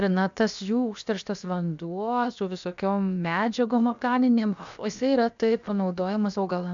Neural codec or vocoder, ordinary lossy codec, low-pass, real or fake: codec, 16 kHz, about 1 kbps, DyCAST, with the encoder's durations; MP3, 64 kbps; 7.2 kHz; fake